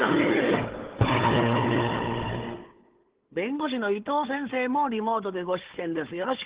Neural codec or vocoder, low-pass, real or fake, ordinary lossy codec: codec, 16 kHz, 4 kbps, FunCodec, trained on Chinese and English, 50 frames a second; 3.6 kHz; fake; Opus, 16 kbps